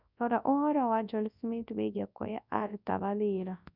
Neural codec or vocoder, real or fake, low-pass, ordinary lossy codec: codec, 24 kHz, 0.9 kbps, WavTokenizer, large speech release; fake; 5.4 kHz; none